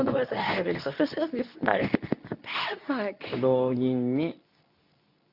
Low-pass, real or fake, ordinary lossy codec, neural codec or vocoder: 5.4 kHz; fake; none; codec, 24 kHz, 0.9 kbps, WavTokenizer, medium speech release version 2